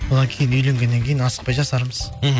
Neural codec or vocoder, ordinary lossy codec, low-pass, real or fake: none; none; none; real